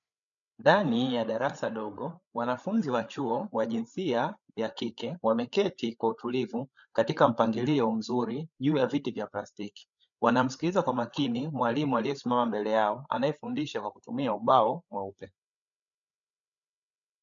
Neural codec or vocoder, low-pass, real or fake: codec, 16 kHz, 8 kbps, FreqCodec, larger model; 7.2 kHz; fake